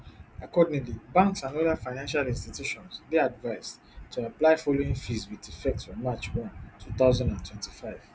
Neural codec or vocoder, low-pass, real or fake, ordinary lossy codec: none; none; real; none